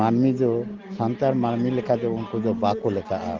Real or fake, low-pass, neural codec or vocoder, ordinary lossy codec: real; 7.2 kHz; none; Opus, 16 kbps